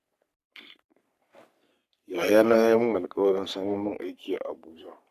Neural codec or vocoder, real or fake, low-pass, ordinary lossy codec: codec, 44.1 kHz, 3.4 kbps, Pupu-Codec; fake; 14.4 kHz; none